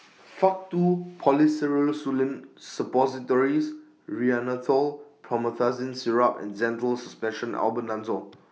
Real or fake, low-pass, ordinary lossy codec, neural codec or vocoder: real; none; none; none